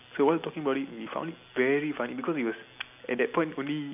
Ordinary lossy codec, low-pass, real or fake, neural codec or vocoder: none; 3.6 kHz; real; none